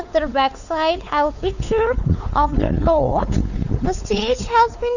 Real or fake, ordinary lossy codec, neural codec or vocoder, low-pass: fake; none; codec, 16 kHz, 4 kbps, X-Codec, WavLM features, trained on Multilingual LibriSpeech; 7.2 kHz